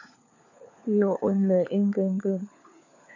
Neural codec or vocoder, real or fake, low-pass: codec, 16 kHz, 4 kbps, FunCodec, trained on LibriTTS, 50 frames a second; fake; 7.2 kHz